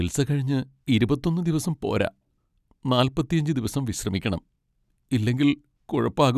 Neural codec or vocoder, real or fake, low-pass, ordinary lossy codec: none; real; 14.4 kHz; none